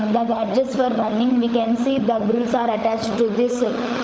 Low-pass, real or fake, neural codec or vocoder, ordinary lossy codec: none; fake; codec, 16 kHz, 16 kbps, FunCodec, trained on LibriTTS, 50 frames a second; none